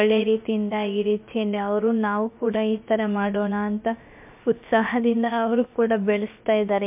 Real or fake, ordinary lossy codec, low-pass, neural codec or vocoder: fake; MP3, 32 kbps; 3.6 kHz; codec, 16 kHz, about 1 kbps, DyCAST, with the encoder's durations